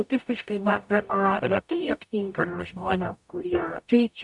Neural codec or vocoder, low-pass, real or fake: codec, 44.1 kHz, 0.9 kbps, DAC; 10.8 kHz; fake